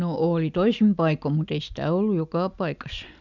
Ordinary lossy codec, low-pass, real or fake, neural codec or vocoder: none; 7.2 kHz; real; none